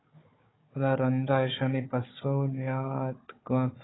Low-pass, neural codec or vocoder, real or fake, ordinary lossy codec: 7.2 kHz; codec, 16 kHz, 4 kbps, FunCodec, trained on Chinese and English, 50 frames a second; fake; AAC, 16 kbps